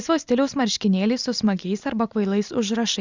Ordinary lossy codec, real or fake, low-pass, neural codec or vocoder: Opus, 64 kbps; real; 7.2 kHz; none